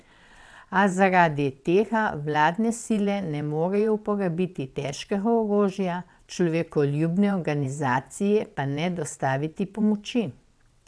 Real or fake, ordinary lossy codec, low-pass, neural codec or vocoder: fake; none; none; vocoder, 22.05 kHz, 80 mel bands, WaveNeXt